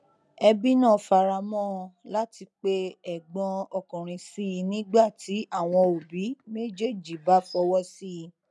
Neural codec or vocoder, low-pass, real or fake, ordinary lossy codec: none; none; real; none